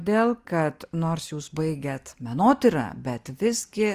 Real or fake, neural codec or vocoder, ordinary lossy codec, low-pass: real; none; Opus, 32 kbps; 14.4 kHz